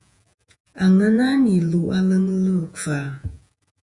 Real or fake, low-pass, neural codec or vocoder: fake; 10.8 kHz; vocoder, 48 kHz, 128 mel bands, Vocos